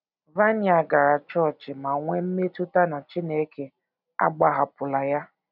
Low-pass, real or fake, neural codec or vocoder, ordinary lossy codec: 5.4 kHz; real; none; none